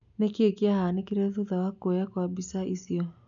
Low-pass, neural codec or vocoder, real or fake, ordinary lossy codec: 7.2 kHz; none; real; none